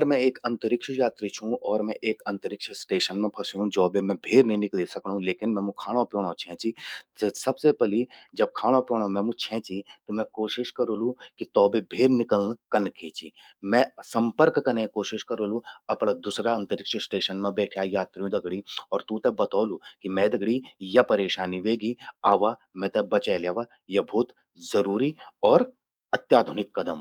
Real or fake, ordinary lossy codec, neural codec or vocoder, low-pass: fake; none; codec, 44.1 kHz, 7.8 kbps, DAC; 19.8 kHz